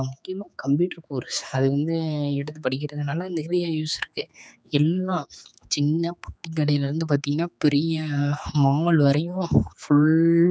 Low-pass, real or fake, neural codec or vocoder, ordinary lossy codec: none; fake; codec, 16 kHz, 4 kbps, X-Codec, HuBERT features, trained on general audio; none